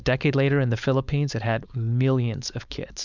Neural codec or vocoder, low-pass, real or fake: codec, 16 kHz, 4.8 kbps, FACodec; 7.2 kHz; fake